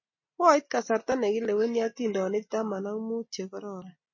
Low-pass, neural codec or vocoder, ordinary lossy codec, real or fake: 7.2 kHz; none; MP3, 32 kbps; real